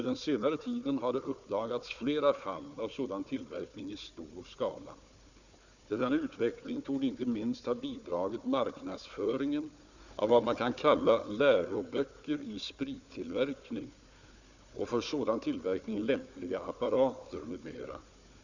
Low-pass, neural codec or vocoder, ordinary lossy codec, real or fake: 7.2 kHz; codec, 16 kHz, 4 kbps, FunCodec, trained on Chinese and English, 50 frames a second; none; fake